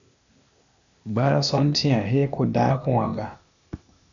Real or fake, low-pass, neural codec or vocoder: fake; 7.2 kHz; codec, 16 kHz, 0.8 kbps, ZipCodec